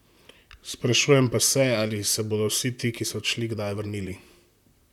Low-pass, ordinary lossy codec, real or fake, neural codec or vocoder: 19.8 kHz; none; fake; vocoder, 44.1 kHz, 128 mel bands, Pupu-Vocoder